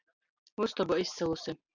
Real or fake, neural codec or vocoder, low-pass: real; none; 7.2 kHz